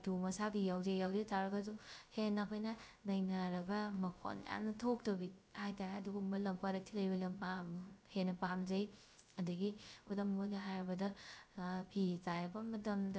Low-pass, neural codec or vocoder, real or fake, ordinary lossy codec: none; codec, 16 kHz, 0.3 kbps, FocalCodec; fake; none